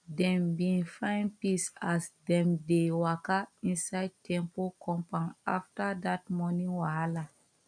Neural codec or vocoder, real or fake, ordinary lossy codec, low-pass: none; real; none; 9.9 kHz